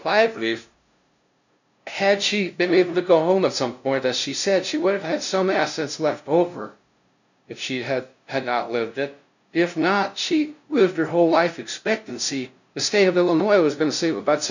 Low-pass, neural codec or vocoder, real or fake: 7.2 kHz; codec, 16 kHz, 0.5 kbps, FunCodec, trained on LibriTTS, 25 frames a second; fake